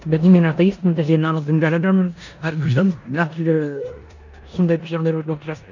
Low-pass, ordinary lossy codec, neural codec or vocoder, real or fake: 7.2 kHz; none; codec, 16 kHz in and 24 kHz out, 0.9 kbps, LongCat-Audio-Codec, four codebook decoder; fake